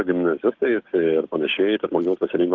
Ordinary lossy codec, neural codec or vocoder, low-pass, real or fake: Opus, 16 kbps; codec, 16 kHz, 6 kbps, DAC; 7.2 kHz; fake